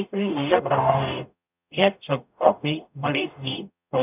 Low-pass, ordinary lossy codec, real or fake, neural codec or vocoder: 3.6 kHz; none; fake; codec, 44.1 kHz, 0.9 kbps, DAC